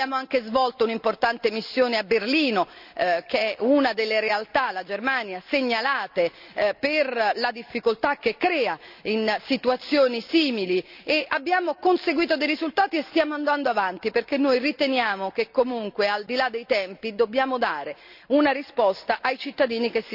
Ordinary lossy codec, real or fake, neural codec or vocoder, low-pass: none; real; none; 5.4 kHz